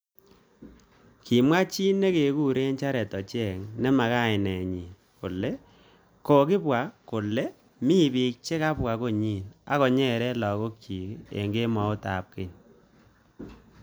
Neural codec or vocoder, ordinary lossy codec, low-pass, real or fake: none; none; none; real